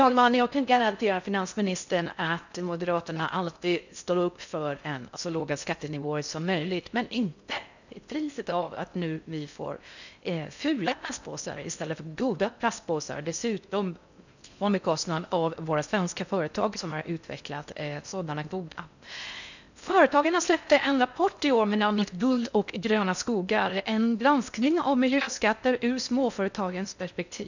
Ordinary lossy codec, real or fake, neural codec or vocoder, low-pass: none; fake; codec, 16 kHz in and 24 kHz out, 0.6 kbps, FocalCodec, streaming, 4096 codes; 7.2 kHz